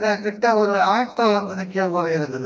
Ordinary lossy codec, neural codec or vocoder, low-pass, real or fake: none; codec, 16 kHz, 1 kbps, FreqCodec, smaller model; none; fake